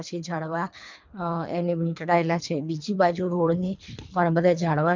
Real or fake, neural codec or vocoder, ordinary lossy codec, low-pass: fake; codec, 24 kHz, 3 kbps, HILCodec; MP3, 64 kbps; 7.2 kHz